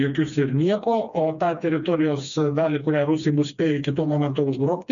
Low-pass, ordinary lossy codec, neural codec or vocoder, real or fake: 7.2 kHz; MP3, 96 kbps; codec, 16 kHz, 2 kbps, FreqCodec, smaller model; fake